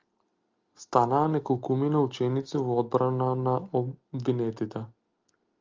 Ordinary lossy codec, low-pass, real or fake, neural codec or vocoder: Opus, 32 kbps; 7.2 kHz; real; none